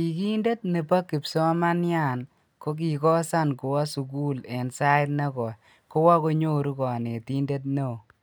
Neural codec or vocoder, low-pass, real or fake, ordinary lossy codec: none; none; real; none